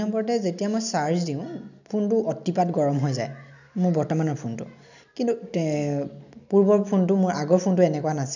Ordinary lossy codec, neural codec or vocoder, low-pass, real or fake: none; none; 7.2 kHz; real